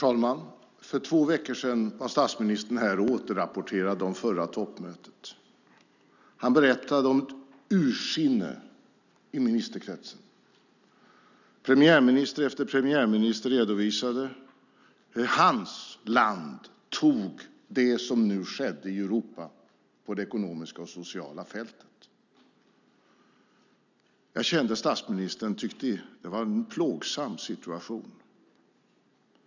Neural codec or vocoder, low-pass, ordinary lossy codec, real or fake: none; 7.2 kHz; none; real